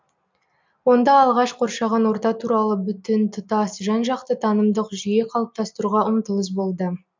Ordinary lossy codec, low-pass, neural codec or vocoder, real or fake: MP3, 64 kbps; 7.2 kHz; none; real